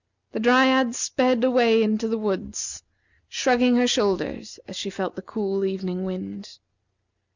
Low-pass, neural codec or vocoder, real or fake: 7.2 kHz; none; real